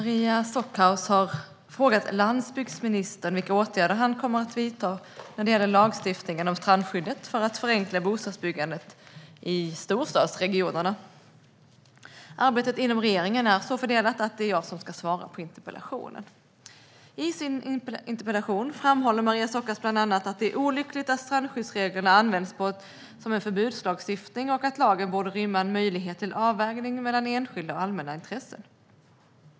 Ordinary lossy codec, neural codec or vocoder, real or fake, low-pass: none; none; real; none